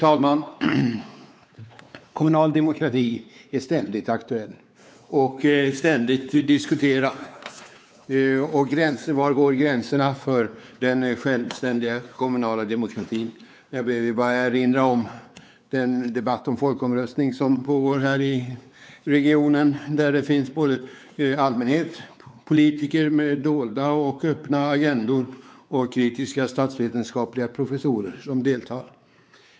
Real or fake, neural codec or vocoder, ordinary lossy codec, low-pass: fake; codec, 16 kHz, 4 kbps, X-Codec, WavLM features, trained on Multilingual LibriSpeech; none; none